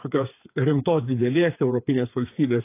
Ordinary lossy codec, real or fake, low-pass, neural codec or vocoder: AAC, 24 kbps; fake; 3.6 kHz; codec, 16 kHz, 4 kbps, FreqCodec, smaller model